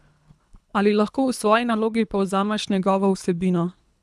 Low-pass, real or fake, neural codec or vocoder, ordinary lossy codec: none; fake; codec, 24 kHz, 3 kbps, HILCodec; none